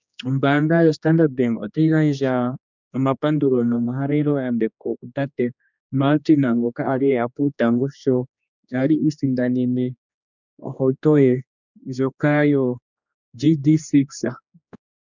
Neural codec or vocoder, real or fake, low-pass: codec, 16 kHz, 2 kbps, X-Codec, HuBERT features, trained on general audio; fake; 7.2 kHz